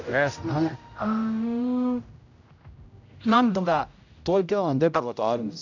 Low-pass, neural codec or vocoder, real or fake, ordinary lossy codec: 7.2 kHz; codec, 16 kHz, 0.5 kbps, X-Codec, HuBERT features, trained on general audio; fake; none